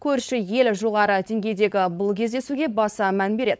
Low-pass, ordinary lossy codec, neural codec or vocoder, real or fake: none; none; codec, 16 kHz, 4.8 kbps, FACodec; fake